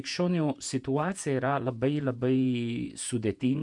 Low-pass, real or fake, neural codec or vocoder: 10.8 kHz; fake; vocoder, 48 kHz, 128 mel bands, Vocos